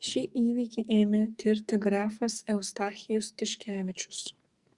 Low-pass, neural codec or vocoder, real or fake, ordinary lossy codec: 10.8 kHz; codec, 44.1 kHz, 2.6 kbps, SNAC; fake; Opus, 64 kbps